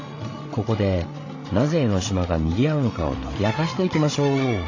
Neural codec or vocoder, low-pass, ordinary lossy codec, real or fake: codec, 16 kHz, 16 kbps, FreqCodec, larger model; 7.2 kHz; AAC, 32 kbps; fake